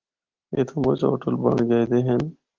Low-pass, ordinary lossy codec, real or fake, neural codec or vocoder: 7.2 kHz; Opus, 16 kbps; real; none